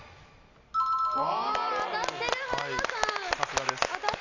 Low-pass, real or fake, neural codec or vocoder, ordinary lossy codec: 7.2 kHz; real; none; none